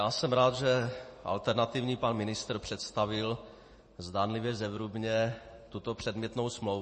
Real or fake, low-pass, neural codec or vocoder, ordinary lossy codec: real; 10.8 kHz; none; MP3, 32 kbps